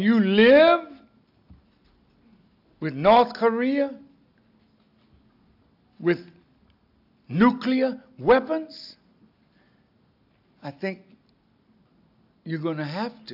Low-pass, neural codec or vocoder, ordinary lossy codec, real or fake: 5.4 kHz; none; AAC, 48 kbps; real